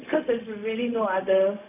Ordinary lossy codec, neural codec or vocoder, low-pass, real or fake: none; codec, 16 kHz, 0.4 kbps, LongCat-Audio-Codec; 3.6 kHz; fake